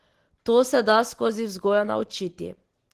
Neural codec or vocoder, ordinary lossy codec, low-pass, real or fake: none; Opus, 16 kbps; 14.4 kHz; real